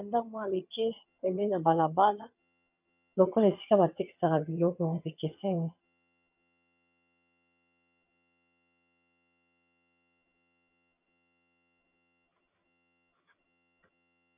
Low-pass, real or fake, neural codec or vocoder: 3.6 kHz; fake; vocoder, 22.05 kHz, 80 mel bands, HiFi-GAN